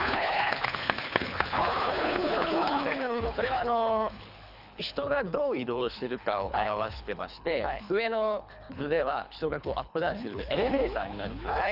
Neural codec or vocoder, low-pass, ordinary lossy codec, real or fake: codec, 24 kHz, 3 kbps, HILCodec; 5.4 kHz; none; fake